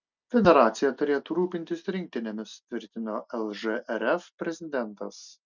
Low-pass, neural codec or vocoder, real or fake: 7.2 kHz; none; real